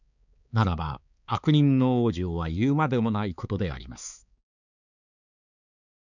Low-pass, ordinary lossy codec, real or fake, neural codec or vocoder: 7.2 kHz; none; fake; codec, 16 kHz, 4 kbps, X-Codec, HuBERT features, trained on balanced general audio